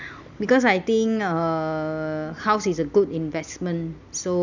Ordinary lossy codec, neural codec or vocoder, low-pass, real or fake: none; none; 7.2 kHz; real